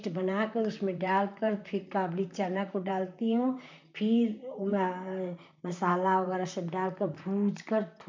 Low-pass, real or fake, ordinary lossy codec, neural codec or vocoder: 7.2 kHz; fake; MP3, 64 kbps; vocoder, 44.1 kHz, 128 mel bands, Pupu-Vocoder